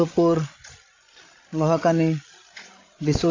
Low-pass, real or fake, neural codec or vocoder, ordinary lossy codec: 7.2 kHz; fake; codec, 16 kHz, 16 kbps, FreqCodec, larger model; AAC, 32 kbps